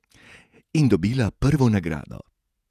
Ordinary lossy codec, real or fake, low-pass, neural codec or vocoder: none; fake; 14.4 kHz; vocoder, 48 kHz, 128 mel bands, Vocos